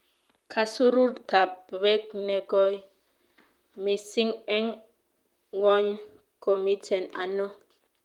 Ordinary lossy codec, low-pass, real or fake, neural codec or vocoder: Opus, 32 kbps; 19.8 kHz; fake; vocoder, 44.1 kHz, 128 mel bands, Pupu-Vocoder